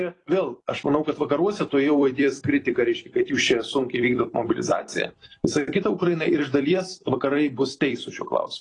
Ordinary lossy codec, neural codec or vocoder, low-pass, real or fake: AAC, 32 kbps; vocoder, 44.1 kHz, 128 mel bands every 256 samples, BigVGAN v2; 10.8 kHz; fake